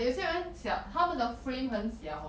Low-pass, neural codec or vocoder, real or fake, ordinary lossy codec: none; none; real; none